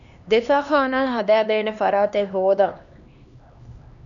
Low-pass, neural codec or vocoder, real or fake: 7.2 kHz; codec, 16 kHz, 2 kbps, X-Codec, HuBERT features, trained on LibriSpeech; fake